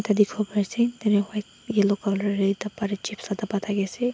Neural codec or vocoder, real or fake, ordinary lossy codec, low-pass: none; real; none; none